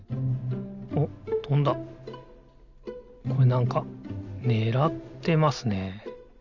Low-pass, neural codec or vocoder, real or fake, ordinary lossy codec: 7.2 kHz; none; real; none